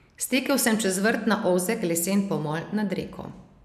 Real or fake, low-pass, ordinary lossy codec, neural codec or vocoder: real; 14.4 kHz; none; none